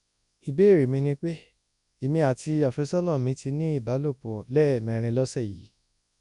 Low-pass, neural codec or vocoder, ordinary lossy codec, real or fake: 10.8 kHz; codec, 24 kHz, 0.9 kbps, WavTokenizer, large speech release; none; fake